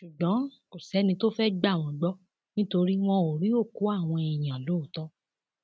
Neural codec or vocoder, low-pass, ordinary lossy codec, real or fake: none; none; none; real